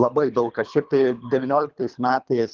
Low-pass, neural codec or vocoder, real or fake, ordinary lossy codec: 7.2 kHz; codec, 24 kHz, 3 kbps, HILCodec; fake; Opus, 24 kbps